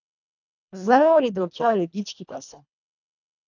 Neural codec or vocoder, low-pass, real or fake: codec, 24 kHz, 1.5 kbps, HILCodec; 7.2 kHz; fake